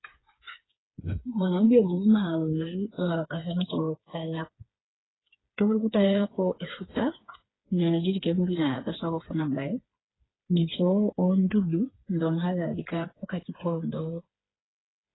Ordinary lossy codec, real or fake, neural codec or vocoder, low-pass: AAC, 16 kbps; fake; codec, 16 kHz, 4 kbps, FreqCodec, smaller model; 7.2 kHz